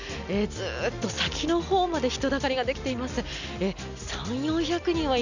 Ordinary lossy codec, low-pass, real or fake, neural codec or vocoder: none; 7.2 kHz; real; none